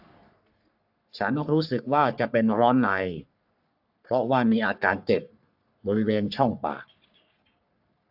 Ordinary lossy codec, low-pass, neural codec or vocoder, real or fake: AAC, 48 kbps; 5.4 kHz; codec, 44.1 kHz, 3.4 kbps, Pupu-Codec; fake